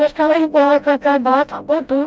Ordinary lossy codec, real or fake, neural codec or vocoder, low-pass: none; fake; codec, 16 kHz, 0.5 kbps, FreqCodec, smaller model; none